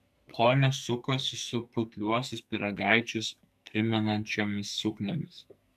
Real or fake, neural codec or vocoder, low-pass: fake; codec, 44.1 kHz, 2.6 kbps, SNAC; 14.4 kHz